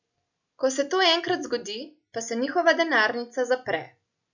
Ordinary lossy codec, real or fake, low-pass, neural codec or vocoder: none; real; 7.2 kHz; none